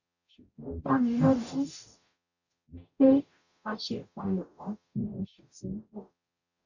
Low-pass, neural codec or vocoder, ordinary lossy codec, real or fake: 7.2 kHz; codec, 44.1 kHz, 0.9 kbps, DAC; none; fake